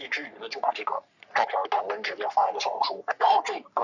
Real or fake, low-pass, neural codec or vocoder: fake; 7.2 kHz; codec, 44.1 kHz, 3.4 kbps, Pupu-Codec